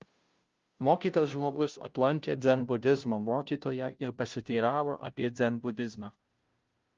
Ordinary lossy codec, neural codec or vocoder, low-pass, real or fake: Opus, 32 kbps; codec, 16 kHz, 0.5 kbps, FunCodec, trained on Chinese and English, 25 frames a second; 7.2 kHz; fake